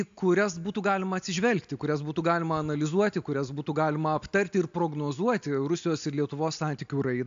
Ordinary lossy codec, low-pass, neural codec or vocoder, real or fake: AAC, 64 kbps; 7.2 kHz; none; real